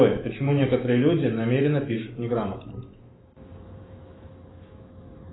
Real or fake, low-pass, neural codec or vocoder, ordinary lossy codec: fake; 7.2 kHz; autoencoder, 48 kHz, 128 numbers a frame, DAC-VAE, trained on Japanese speech; AAC, 16 kbps